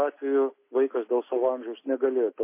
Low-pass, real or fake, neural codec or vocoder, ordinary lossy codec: 3.6 kHz; real; none; MP3, 24 kbps